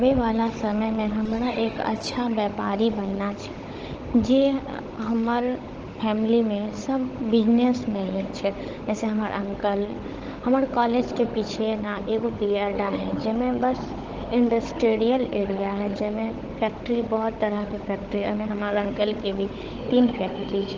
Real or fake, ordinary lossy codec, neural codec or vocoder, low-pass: fake; Opus, 16 kbps; codec, 16 kHz, 16 kbps, FunCodec, trained on Chinese and English, 50 frames a second; 7.2 kHz